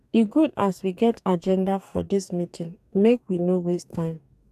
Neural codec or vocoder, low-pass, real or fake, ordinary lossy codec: codec, 44.1 kHz, 2.6 kbps, DAC; 14.4 kHz; fake; none